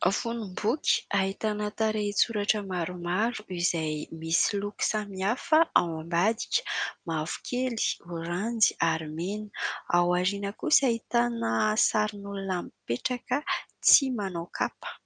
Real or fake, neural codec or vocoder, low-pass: real; none; 9.9 kHz